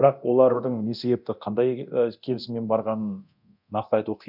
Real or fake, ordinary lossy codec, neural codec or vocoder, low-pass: fake; none; codec, 24 kHz, 0.9 kbps, DualCodec; 5.4 kHz